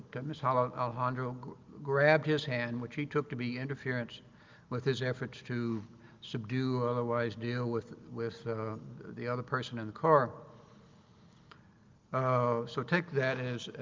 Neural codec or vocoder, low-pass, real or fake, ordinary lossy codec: none; 7.2 kHz; real; Opus, 32 kbps